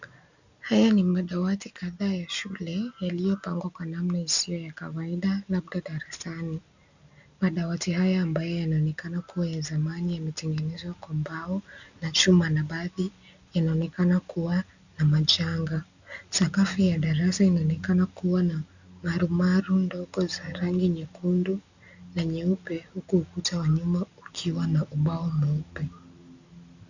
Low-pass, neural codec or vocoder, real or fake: 7.2 kHz; none; real